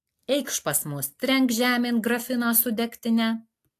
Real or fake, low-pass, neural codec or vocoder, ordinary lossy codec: real; 14.4 kHz; none; AAC, 64 kbps